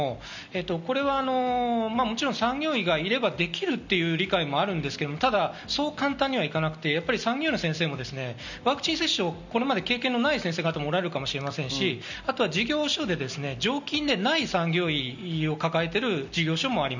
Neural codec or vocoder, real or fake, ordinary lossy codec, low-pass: none; real; none; 7.2 kHz